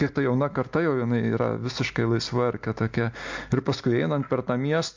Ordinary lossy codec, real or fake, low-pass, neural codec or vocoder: MP3, 48 kbps; real; 7.2 kHz; none